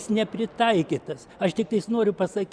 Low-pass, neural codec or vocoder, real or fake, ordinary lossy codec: 9.9 kHz; none; real; Opus, 64 kbps